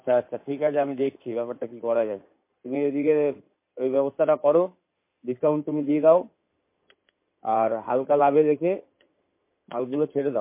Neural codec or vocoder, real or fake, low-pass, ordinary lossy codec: codec, 16 kHz in and 24 kHz out, 2.2 kbps, FireRedTTS-2 codec; fake; 3.6 kHz; MP3, 24 kbps